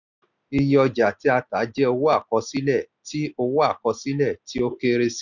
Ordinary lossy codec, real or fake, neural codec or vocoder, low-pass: none; real; none; 7.2 kHz